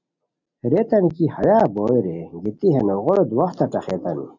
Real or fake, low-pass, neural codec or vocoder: real; 7.2 kHz; none